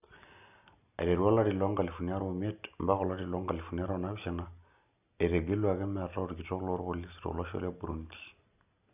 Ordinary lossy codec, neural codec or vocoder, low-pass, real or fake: none; none; 3.6 kHz; real